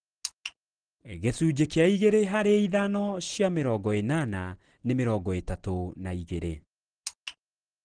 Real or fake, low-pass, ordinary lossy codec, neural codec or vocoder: real; 9.9 kHz; Opus, 16 kbps; none